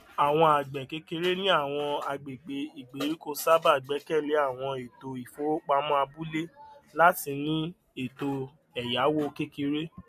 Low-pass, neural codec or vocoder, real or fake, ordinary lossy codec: 14.4 kHz; none; real; MP3, 64 kbps